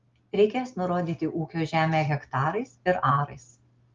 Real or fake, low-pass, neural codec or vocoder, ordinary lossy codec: real; 7.2 kHz; none; Opus, 32 kbps